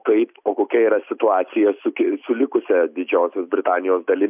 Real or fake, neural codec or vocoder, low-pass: real; none; 3.6 kHz